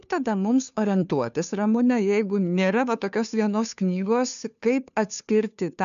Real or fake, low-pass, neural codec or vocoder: fake; 7.2 kHz; codec, 16 kHz, 2 kbps, FunCodec, trained on Chinese and English, 25 frames a second